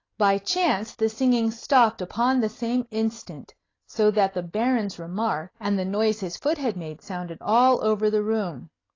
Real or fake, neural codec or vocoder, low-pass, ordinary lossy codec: real; none; 7.2 kHz; AAC, 32 kbps